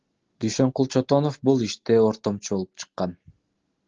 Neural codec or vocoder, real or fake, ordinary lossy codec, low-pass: none; real; Opus, 16 kbps; 7.2 kHz